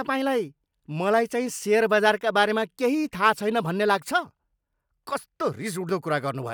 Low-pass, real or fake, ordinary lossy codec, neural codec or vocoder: none; real; none; none